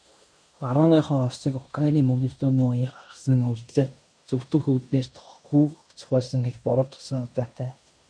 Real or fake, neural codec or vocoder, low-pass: fake; codec, 16 kHz in and 24 kHz out, 0.9 kbps, LongCat-Audio-Codec, fine tuned four codebook decoder; 9.9 kHz